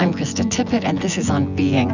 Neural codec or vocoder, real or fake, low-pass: vocoder, 24 kHz, 100 mel bands, Vocos; fake; 7.2 kHz